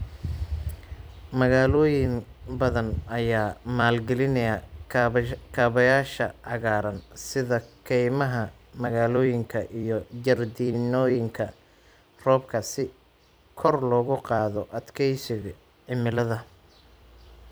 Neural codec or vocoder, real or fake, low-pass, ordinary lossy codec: vocoder, 44.1 kHz, 128 mel bands every 256 samples, BigVGAN v2; fake; none; none